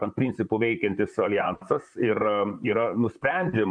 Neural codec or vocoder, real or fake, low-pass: vocoder, 44.1 kHz, 128 mel bands, Pupu-Vocoder; fake; 9.9 kHz